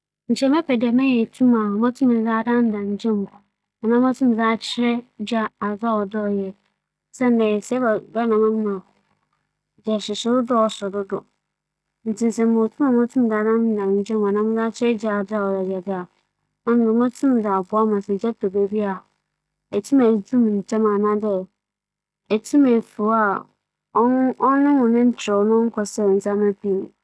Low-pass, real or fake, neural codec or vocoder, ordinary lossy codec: none; real; none; none